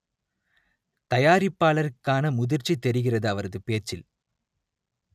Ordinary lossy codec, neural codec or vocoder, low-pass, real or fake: none; none; 14.4 kHz; real